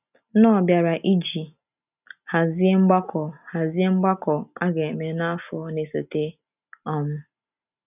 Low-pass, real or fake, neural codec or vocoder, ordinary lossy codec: 3.6 kHz; real; none; none